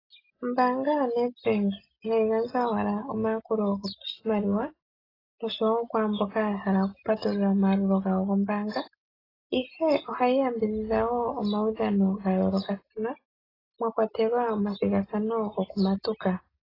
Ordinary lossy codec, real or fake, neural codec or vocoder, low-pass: AAC, 24 kbps; real; none; 5.4 kHz